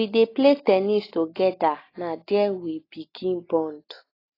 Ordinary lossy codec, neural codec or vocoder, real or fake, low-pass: AAC, 24 kbps; none; real; 5.4 kHz